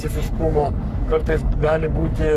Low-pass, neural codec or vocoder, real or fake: 14.4 kHz; codec, 44.1 kHz, 3.4 kbps, Pupu-Codec; fake